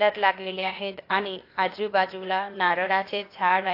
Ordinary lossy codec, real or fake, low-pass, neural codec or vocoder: AAC, 32 kbps; fake; 5.4 kHz; codec, 16 kHz, 0.8 kbps, ZipCodec